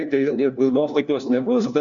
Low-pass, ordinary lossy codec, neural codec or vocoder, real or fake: 7.2 kHz; Opus, 64 kbps; codec, 16 kHz, 1 kbps, FunCodec, trained on LibriTTS, 50 frames a second; fake